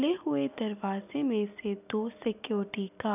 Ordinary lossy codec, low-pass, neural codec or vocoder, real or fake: none; 3.6 kHz; none; real